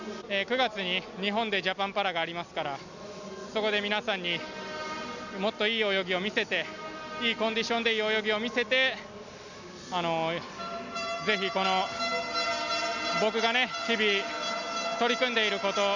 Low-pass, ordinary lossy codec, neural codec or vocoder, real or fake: 7.2 kHz; none; none; real